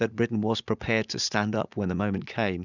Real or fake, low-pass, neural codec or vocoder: fake; 7.2 kHz; vocoder, 44.1 kHz, 80 mel bands, Vocos